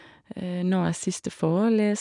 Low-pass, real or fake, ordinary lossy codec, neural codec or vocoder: 10.8 kHz; real; none; none